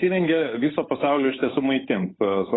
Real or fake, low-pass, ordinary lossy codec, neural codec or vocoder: fake; 7.2 kHz; AAC, 16 kbps; codec, 16 kHz, 8 kbps, FunCodec, trained on Chinese and English, 25 frames a second